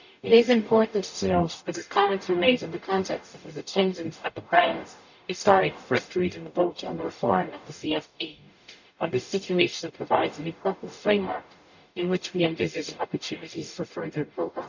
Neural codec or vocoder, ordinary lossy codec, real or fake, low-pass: codec, 44.1 kHz, 0.9 kbps, DAC; none; fake; 7.2 kHz